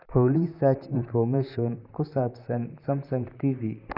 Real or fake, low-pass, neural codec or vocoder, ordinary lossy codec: fake; 5.4 kHz; vocoder, 44.1 kHz, 128 mel bands, Pupu-Vocoder; none